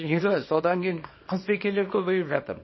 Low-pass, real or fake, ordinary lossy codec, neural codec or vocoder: 7.2 kHz; fake; MP3, 24 kbps; codec, 24 kHz, 0.9 kbps, WavTokenizer, small release